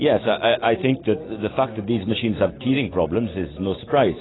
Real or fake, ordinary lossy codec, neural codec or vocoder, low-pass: real; AAC, 16 kbps; none; 7.2 kHz